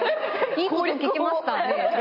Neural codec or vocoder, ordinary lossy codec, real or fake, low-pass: none; MP3, 24 kbps; real; 5.4 kHz